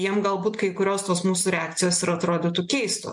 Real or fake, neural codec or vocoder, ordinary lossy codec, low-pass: real; none; MP3, 64 kbps; 10.8 kHz